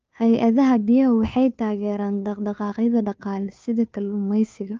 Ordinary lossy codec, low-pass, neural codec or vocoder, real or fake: Opus, 24 kbps; 7.2 kHz; codec, 16 kHz, 2 kbps, FunCodec, trained on Chinese and English, 25 frames a second; fake